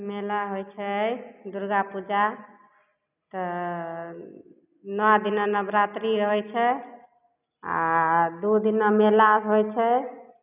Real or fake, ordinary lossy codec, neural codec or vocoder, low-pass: real; none; none; 3.6 kHz